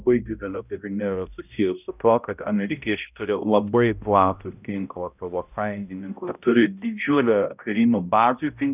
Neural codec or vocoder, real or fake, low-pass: codec, 16 kHz, 0.5 kbps, X-Codec, HuBERT features, trained on balanced general audio; fake; 3.6 kHz